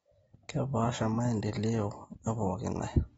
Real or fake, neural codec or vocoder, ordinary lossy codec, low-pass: real; none; AAC, 24 kbps; 10.8 kHz